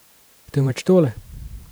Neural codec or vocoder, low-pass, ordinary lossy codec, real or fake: vocoder, 44.1 kHz, 128 mel bands every 512 samples, BigVGAN v2; none; none; fake